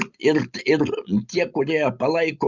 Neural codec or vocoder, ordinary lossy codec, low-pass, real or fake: none; Opus, 64 kbps; 7.2 kHz; real